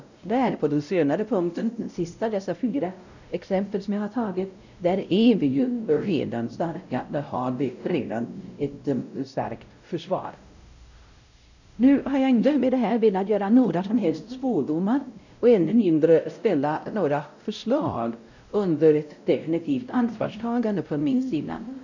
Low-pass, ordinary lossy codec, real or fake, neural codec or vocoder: 7.2 kHz; none; fake; codec, 16 kHz, 0.5 kbps, X-Codec, WavLM features, trained on Multilingual LibriSpeech